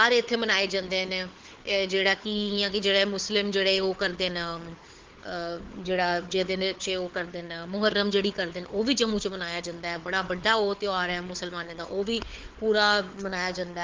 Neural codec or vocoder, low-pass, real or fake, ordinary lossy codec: codec, 16 kHz, 4 kbps, FunCodec, trained on Chinese and English, 50 frames a second; 7.2 kHz; fake; Opus, 16 kbps